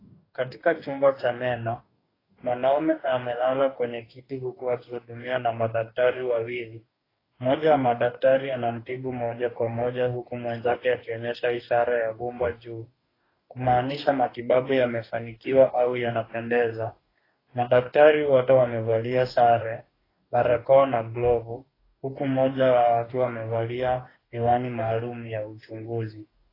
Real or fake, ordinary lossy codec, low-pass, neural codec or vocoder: fake; AAC, 24 kbps; 5.4 kHz; codec, 44.1 kHz, 2.6 kbps, DAC